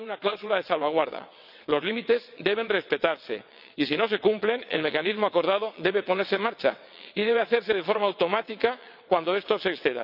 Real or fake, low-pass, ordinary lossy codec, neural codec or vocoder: fake; 5.4 kHz; none; vocoder, 22.05 kHz, 80 mel bands, WaveNeXt